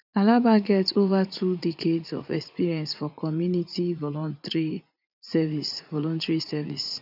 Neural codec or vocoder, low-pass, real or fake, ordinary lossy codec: vocoder, 44.1 kHz, 80 mel bands, Vocos; 5.4 kHz; fake; none